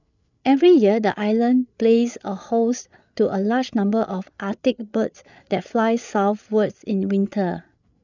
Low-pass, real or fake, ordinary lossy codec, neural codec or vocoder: 7.2 kHz; fake; none; codec, 16 kHz, 8 kbps, FreqCodec, larger model